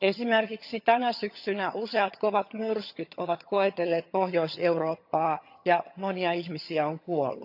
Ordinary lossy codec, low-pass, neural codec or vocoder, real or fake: none; 5.4 kHz; vocoder, 22.05 kHz, 80 mel bands, HiFi-GAN; fake